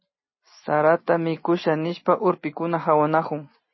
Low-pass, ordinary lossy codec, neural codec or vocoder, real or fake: 7.2 kHz; MP3, 24 kbps; none; real